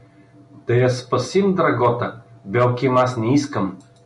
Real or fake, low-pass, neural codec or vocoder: real; 10.8 kHz; none